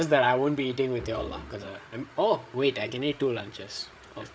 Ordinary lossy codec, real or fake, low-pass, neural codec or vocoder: none; fake; none; codec, 16 kHz, 8 kbps, FreqCodec, larger model